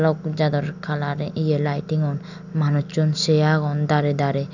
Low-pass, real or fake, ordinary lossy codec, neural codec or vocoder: 7.2 kHz; real; none; none